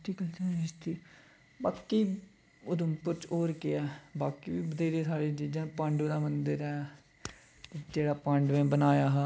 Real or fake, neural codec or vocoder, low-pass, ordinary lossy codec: real; none; none; none